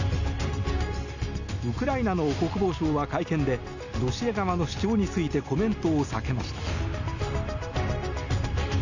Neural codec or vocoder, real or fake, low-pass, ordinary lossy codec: none; real; 7.2 kHz; none